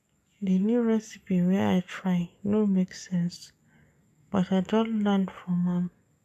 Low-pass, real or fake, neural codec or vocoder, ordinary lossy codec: 14.4 kHz; fake; codec, 44.1 kHz, 7.8 kbps, DAC; none